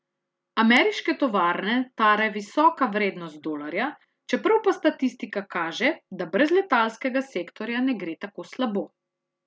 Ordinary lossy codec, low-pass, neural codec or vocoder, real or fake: none; none; none; real